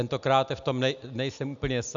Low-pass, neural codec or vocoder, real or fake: 7.2 kHz; none; real